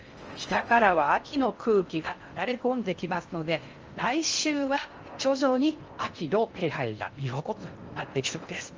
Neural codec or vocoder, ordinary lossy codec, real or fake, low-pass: codec, 16 kHz in and 24 kHz out, 0.6 kbps, FocalCodec, streaming, 4096 codes; Opus, 24 kbps; fake; 7.2 kHz